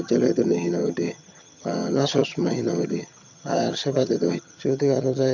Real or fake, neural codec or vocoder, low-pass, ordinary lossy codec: fake; vocoder, 22.05 kHz, 80 mel bands, HiFi-GAN; 7.2 kHz; none